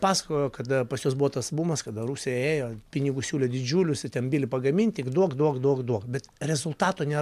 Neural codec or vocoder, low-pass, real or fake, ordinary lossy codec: none; 14.4 kHz; real; AAC, 96 kbps